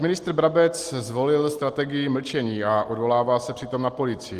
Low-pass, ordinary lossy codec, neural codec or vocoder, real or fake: 14.4 kHz; Opus, 24 kbps; none; real